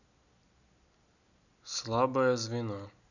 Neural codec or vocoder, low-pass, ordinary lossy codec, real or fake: none; 7.2 kHz; none; real